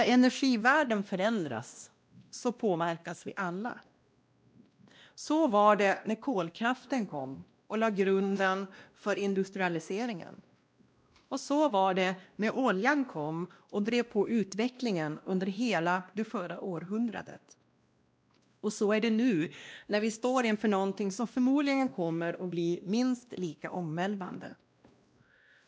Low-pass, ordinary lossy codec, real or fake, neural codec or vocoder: none; none; fake; codec, 16 kHz, 1 kbps, X-Codec, WavLM features, trained on Multilingual LibriSpeech